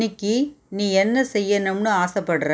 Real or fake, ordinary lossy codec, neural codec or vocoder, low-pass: real; none; none; none